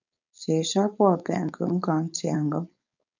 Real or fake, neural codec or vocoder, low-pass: fake; codec, 16 kHz, 4.8 kbps, FACodec; 7.2 kHz